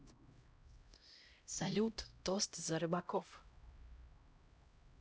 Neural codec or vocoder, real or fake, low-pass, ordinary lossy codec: codec, 16 kHz, 0.5 kbps, X-Codec, HuBERT features, trained on LibriSpeech; fake; none; none